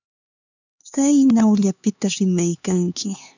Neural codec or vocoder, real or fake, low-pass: codec, 16 kHz, 4 kbps, X-Codec, HuBERT features, trained on LibriSpeech; fake; 7.2 kHz